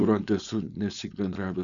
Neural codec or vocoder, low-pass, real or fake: codec, 16 kHz, 4.8 kbps, FACodec; 7.2 kHz; fake